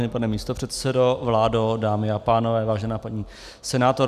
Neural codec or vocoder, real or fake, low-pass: none; real; 14.4 kHz